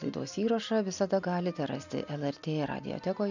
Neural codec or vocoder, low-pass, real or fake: none; 7.2 kHz; real